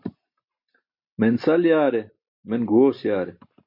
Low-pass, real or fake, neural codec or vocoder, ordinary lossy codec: 5.4 kHz; real; none; MP3, 32 kbps